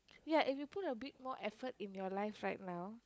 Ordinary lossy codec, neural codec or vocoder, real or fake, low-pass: none; codec, 16 kHz, 16 kbps, FunCodec, trained on LibriTTS, 50 frames a second; fake; none